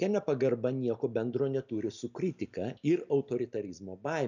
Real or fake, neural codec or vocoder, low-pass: real; none; 7.2 kHz